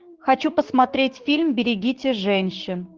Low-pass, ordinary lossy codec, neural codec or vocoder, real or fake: 7.2 kHz; Opus, 16 kbps; codec, 16 kHz, 6 kbps, DAC; fake